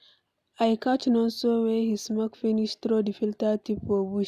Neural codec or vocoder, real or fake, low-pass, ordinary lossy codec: none; real; 14.4 kHz; none